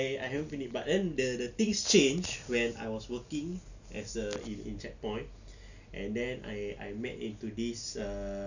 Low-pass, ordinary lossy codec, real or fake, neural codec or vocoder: 7.2 kHz; none; real; none